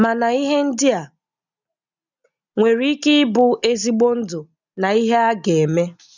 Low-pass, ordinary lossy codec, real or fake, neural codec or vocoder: 7.2 kHz; none; real; none